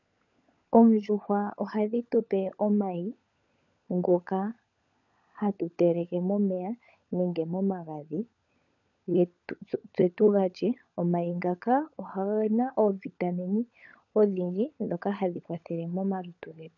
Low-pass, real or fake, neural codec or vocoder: 7.2 kHz; fake; codec, 16 kHz, 16 kbps, FunCodec, trained on LibriTTS, 50 frames a second